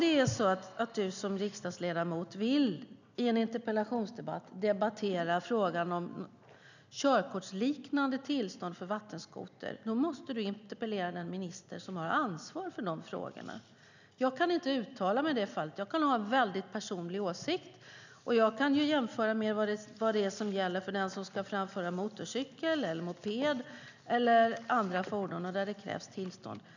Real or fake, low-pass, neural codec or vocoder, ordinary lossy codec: real; 7.2 kHz; none; none